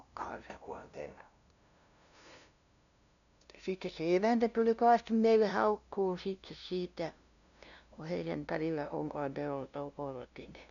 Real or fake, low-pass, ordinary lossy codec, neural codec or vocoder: fake; 7.2 kHz; none; codec, 16 kHz, 0.5 kbps, FunCodec, trained on LibriTTS, 25 frames a second